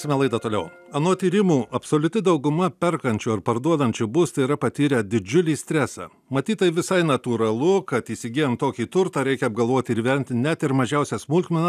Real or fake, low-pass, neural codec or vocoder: real; 14.4 kHz; none